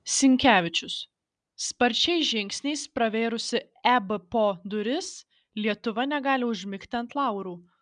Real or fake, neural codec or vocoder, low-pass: real; none; 9.9 kHz